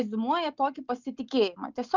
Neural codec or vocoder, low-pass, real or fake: none; 7.2 kHz; real